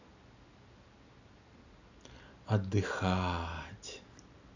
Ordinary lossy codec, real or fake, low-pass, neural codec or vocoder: none; real; 7.2 kHz; none